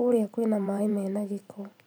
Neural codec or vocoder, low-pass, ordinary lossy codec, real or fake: vocoder, 44.1 kHz, 128 mel bands every 512 samples, BigVGAN v2; none; none; fake